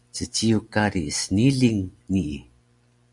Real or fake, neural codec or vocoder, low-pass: real; none; 10.8 kHz